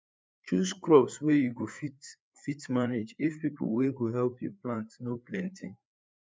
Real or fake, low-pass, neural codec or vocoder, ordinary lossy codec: fake; none; codec, 16 kHz, 4 kbps, FreqCodec, larger model; none